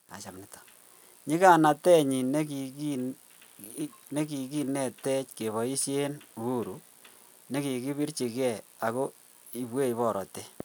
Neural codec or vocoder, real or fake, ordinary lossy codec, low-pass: none; real; none; none